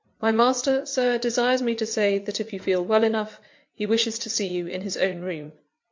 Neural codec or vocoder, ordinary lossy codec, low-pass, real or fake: vocoder, 22.05 kHz, 80 mel bands, WaveNeXt; MP3, 48 kbps; 7.2 kHz; fake